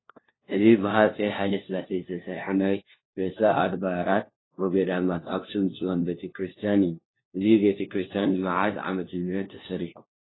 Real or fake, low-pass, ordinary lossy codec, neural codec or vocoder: fake; 7.2 kHz; AAC, 16 kbps; codec, 16 kHz, 1 kbps, FunCodec, trained on LibriTTS, 50 frames a second